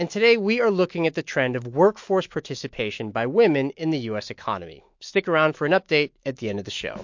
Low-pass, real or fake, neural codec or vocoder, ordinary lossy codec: 7.2 kHz; fake; autoencoder, 48 kHz, 128 numbers a frame, DAC-VAE, trained on Japanese speech; MP3, 48 kbps